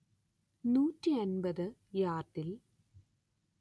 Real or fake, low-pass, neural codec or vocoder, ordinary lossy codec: real; none; none; none